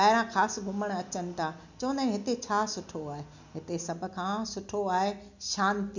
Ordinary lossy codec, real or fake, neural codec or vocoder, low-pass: none; real; none; 7.2 kHz